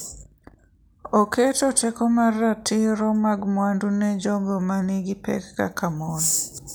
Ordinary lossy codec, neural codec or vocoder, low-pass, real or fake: none; none; none; real